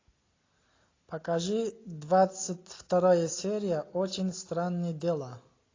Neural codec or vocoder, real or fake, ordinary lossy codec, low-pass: none; real; AAC, 32 kbps; 7.2 kHz